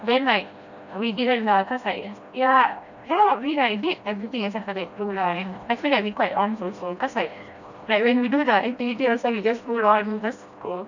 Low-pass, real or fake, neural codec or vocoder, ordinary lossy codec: 7.2 kHz; fake; codec, 16 kHz, 1 kbps, FreqCodec, smaller model; none